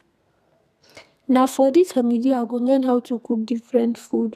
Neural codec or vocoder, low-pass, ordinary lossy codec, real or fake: codec, 32 kHz, 1.9 kbps, SNAC; 14.4 kHz; none; fake